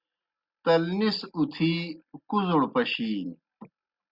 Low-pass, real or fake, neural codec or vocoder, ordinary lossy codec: 5.4 kHz; real; none; Opus, 64 kbps